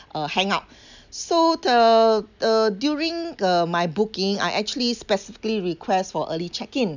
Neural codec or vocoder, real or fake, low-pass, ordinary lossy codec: none; real; 7.2 kHz; none